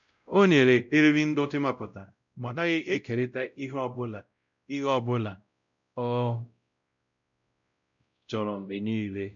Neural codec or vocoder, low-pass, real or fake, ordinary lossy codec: codec, 16 kHz, 0.5 kbps, X-Codec, WavLM features, trained on Multilingual LibriSpeech; 7.2 kHz; fake; none